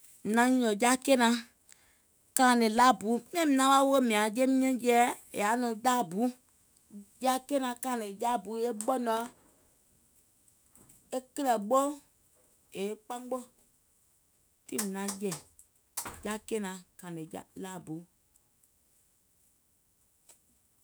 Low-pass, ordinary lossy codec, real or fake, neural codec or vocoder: none; none; fake; autoencoder, 48 kHz, 128 numbers a frame, DAC-VAE, trained on Japanese speech